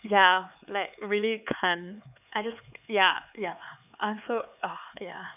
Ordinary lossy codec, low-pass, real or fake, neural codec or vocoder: none; 3.6 kHz; fake; codec, 16 kHz, 4 kbps, X-Codec, HuBERT features, trained on LibriSpeech